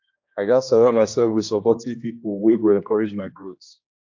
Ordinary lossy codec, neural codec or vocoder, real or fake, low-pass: AAC, 48 kbps; codec, 16 kHz, 1 kbps, X-Codec, HuBERT features, trained on general audio; fake; 7.2 kHz